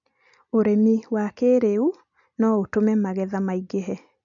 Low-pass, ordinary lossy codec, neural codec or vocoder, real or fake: 7.2 kHz; none; none; real